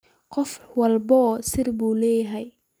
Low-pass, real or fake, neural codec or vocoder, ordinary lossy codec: none; real; none; none